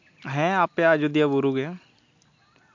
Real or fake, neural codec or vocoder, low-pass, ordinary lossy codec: real; none; 7.2 kHz; MP3, 48 kbps